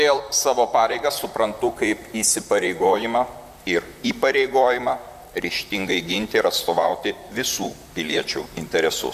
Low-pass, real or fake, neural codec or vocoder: 14.4 kHz; fake; vocoder, 44.1 kHz, 128 mel bands, Pupu-Vocoder